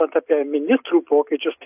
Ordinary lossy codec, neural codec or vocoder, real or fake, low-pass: AAC, 32 kbps; none; real; 3.6 kHz